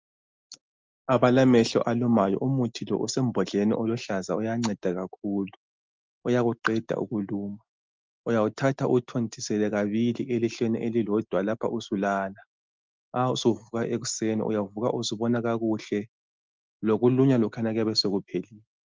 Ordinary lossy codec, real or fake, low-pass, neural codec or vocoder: Opus, 24 kbps; real; 7.2 kHz; none